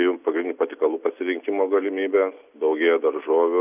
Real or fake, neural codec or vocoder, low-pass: real; none; 3.6 kHz